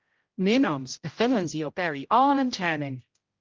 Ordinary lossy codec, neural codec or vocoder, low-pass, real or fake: Opus, 32 kbps; codec, 16 kHz, 0.5 kbps, X-Codec, HuBERT features, trained on general audio; 7.2 kHz; fake